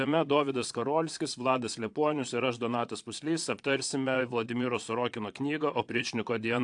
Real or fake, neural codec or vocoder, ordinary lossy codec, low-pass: fake; vocoder, 22.05 kHz, 80 mel bands, Vocos; AAC, 64 kbps; 9.9 kHz